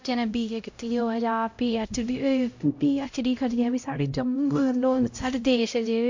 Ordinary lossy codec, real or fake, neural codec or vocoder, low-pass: MP3, 48 kbps; fake; codec, 16 kHz, 0.5 kbps, X-Codec, HuBERT features, trained on LibriSpeech; 7.2 kHz